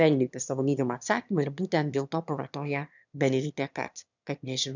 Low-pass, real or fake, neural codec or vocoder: 7.2 kHz; fake; autoencoder, 22.05 kHz, a latent of 192 numbers a frame, VITS, trained on one speaker